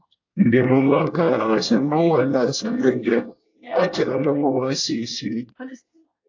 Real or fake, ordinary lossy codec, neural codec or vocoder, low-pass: fake; AAC, 48 kbps; codec, 24 kHz, 1 kbps, SNAC; 7.2 kHz